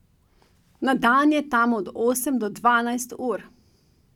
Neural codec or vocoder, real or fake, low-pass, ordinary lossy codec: none; real; 19.8 kHz; none